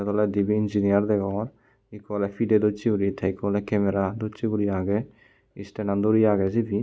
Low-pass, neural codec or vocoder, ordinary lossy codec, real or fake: none; none; none; real